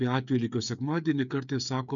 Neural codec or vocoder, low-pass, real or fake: codec, 16 kHz, 8 kbps, FreqCodec, smaller model; 7.2 kHz; fake